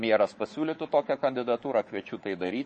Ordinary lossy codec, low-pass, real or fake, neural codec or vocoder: MP3, 32 kbps; 10.8 kHz; fake; codec, 24 kHz, 3.1 kbps, DualCodec